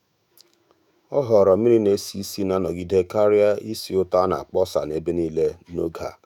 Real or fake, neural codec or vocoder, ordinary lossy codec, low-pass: fake; autoencoder, 48 kHz, 128 numbers a frame, DAC-VAE, trained on Japanese speech; none; 19.8 kHz